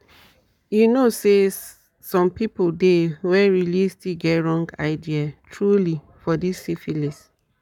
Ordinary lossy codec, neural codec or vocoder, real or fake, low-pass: none; codec, 44.1 kHz, 7.8 kbps, Pupu-Codec; fake; 19.8 kHz